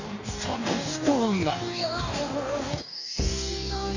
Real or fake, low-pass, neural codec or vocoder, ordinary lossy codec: fake; 7.2 kHz; codec, 44.1 kHz, 2.6 kbps, DAC; none